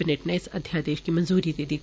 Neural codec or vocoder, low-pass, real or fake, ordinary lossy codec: none; none; real; none